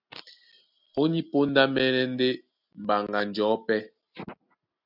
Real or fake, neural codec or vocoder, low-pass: real; none; 5.4 kHz